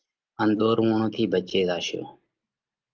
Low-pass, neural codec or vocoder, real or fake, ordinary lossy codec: 7.2 kHz; none; real; Opus, 24 kbps